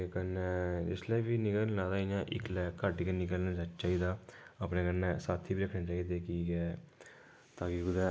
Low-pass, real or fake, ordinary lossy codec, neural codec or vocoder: none; real; none; none